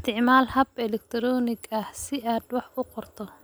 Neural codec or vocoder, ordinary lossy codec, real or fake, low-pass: none; none; real; none